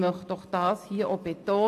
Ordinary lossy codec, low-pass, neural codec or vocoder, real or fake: AAC, 64 kbps; 14.4 kHz; none; real